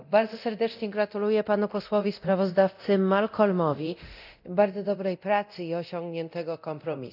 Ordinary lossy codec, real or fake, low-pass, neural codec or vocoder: none; fake; 5.4 kHz; codec, 24 kHz, 0.9 kbps, DualCodec